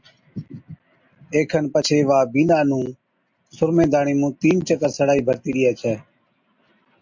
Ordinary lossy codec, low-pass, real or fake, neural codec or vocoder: MP3, 48 kbps; 7.2 kHz; real; none